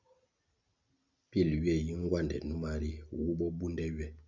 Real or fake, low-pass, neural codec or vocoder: real; 7.2 kHz; none